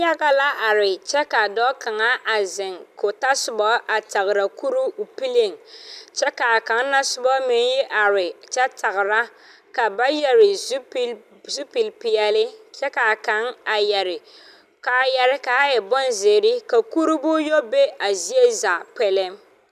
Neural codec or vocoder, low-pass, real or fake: none; 14.4 kHz; real